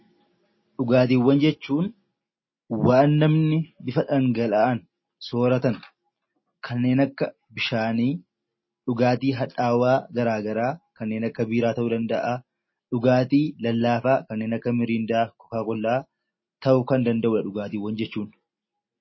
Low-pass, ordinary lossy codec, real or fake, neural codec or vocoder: 7.2 kHz; MP3, 24 kbps; real; none